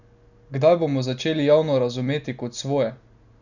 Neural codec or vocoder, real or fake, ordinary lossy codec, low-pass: none; real; none; 7.2 kHz